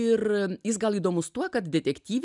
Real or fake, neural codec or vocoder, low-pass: real; none; 10.8 kHz